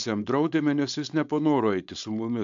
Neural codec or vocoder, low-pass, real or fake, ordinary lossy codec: codec, 16 kHz, 4.8 kbps, FACodec; 7.2 kHz; fake; AAC, 64 kbps